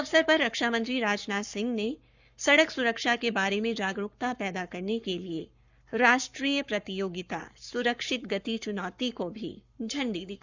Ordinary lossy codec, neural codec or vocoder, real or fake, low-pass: Opus, 64 kbps; codec, 44.1 kHz, 7.8 kbps, Pupu-Codec; fake; 7.2 kHz